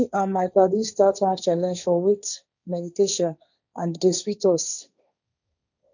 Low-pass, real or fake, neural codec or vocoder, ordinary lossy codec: 7.2 kHz; fake; codec, 16 kHz, 1.1 kbps, Voila-Tokenizer; none